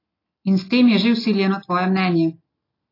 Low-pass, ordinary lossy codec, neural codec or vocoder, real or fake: 5.4 kHz; AAC, 24 kbps; none; real